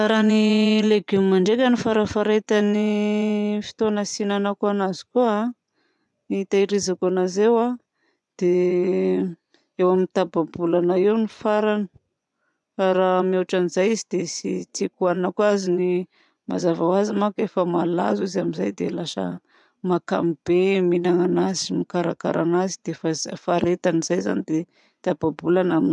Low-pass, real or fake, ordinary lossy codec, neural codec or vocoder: 10.8 kHz; fake; none; vocoder, 24 kHz, 100 mel bands, Vocos